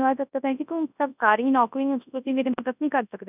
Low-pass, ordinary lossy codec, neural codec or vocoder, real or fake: 3.6 kHz; none; codec, 24 kHz, 0.9 kbps, WavTokenizer, large speech release; fake